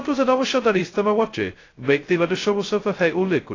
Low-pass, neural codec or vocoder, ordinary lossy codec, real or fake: 7.2 kHz; codec, 16 kHz, 0.2 kbps, FocalCodec; AAC, 32 kbps; fake